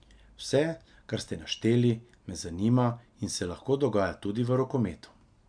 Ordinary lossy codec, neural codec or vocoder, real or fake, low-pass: MP3, 96 kbps; none; real; 9.9 kHz